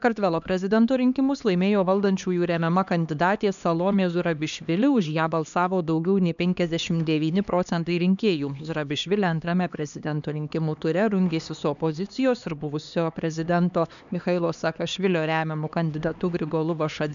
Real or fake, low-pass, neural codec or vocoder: fake; 7.2 kHz; codec, 16 kHz, 2 kbps, X-Codec, HuBERT features, trained on LibriSpeech